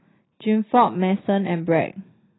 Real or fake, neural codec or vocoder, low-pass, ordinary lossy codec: real; none; 7.2 kHz; AAC, 16 kbps